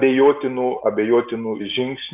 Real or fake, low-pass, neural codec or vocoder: real; 3.6 kHz; none